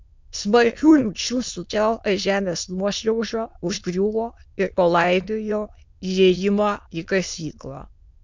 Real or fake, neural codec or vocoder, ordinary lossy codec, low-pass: fake; autoencoder, 22.05 kHz, a latent of 192 numbers a frame, VITS, trained on many speakers; MP3, 64 kbps; 7.2 kHz